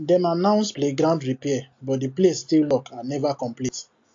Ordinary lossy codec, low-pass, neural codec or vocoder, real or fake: AAC, 48 kbps; 7.2 kHz; none; real